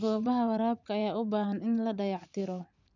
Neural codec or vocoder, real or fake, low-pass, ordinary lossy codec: vocoder, 44.1 kHz, 80 mel bands, Vocos; fake; 7.2 kHz; none